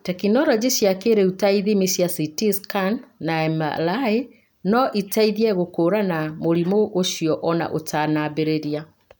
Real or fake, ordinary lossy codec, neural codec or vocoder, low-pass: real; none; none; none